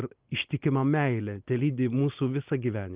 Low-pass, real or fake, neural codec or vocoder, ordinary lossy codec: 3.6 kHz; real; none; Opus, 32 kbps